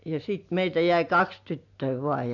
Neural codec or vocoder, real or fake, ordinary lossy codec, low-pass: none; real; none; 7.2 kHz